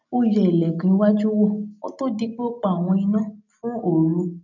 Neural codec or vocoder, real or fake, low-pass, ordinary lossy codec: none; real; 7.2 kHz; none